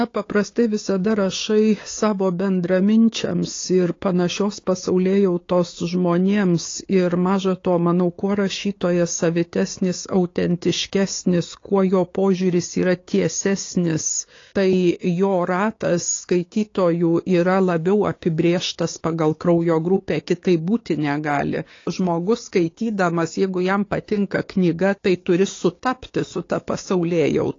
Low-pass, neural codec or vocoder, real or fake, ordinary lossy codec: 7.2 kHz; codec, 16 kHz, 6 kbps, DAC; fake; AAC, 32 kbps